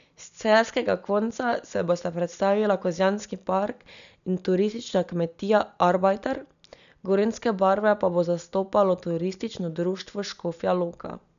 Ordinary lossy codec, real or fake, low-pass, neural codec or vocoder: none; real; 7.2 kHz; none